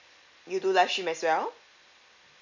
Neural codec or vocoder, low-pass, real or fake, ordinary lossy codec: none; 7.2 kHz; real; none